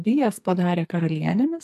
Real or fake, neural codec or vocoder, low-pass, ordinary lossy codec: fake; codec, 44.1 kHz, 2.6 kbps, SNAC; 14.4 kHz; AAC, 96 kbps